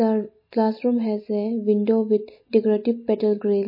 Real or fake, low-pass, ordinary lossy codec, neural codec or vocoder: real; 5.4 kHz; MP3, 24 kbps; none